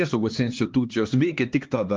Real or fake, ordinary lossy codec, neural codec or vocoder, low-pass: fake; Opus, 32 kbps; codec, 16 kHz, 2 kbps, X-Codec, HuBERT features, trained on LibriSpeech; 7.2 kHz